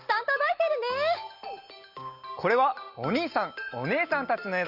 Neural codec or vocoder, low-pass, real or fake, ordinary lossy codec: none; 5.4 kHz; real; Opus, 32 kbps